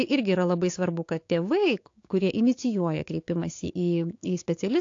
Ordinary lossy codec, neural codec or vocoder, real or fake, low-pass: AAC, 48 kbps; codec, 16 kHz, 6 kbps, DAC; fake; 7.2 kHz